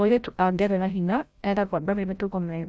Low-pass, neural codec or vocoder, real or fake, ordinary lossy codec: none; codec, 16 kHz, 0.5 kbps, FreqCodec, larger model; fake; none